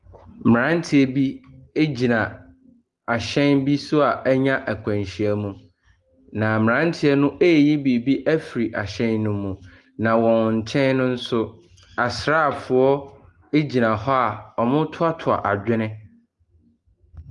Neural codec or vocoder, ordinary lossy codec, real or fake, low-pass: none; Opus, 24 kbps; real; 10.8 kHz